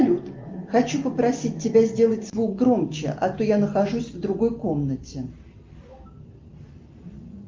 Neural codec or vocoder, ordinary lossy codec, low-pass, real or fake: none; Opus, 24 kbps; 7.2 kHz; real